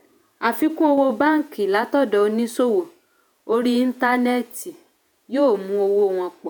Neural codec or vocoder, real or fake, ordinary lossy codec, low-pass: vocoder, 48 kHz, 128 mel bands, Vocos; fake; none; none